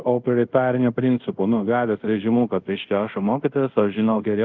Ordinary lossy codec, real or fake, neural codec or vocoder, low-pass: Opus, 24 kbps; fake; codec, 24 kHz, 0.5 kbps, DualCodec; 7.2 kHz